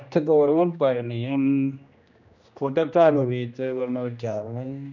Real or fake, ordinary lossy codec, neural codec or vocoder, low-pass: fake; none; codec, 16 kHz, 1 kbps, X-Codec, HuBERT features, trained on general audio; 7.2 kHz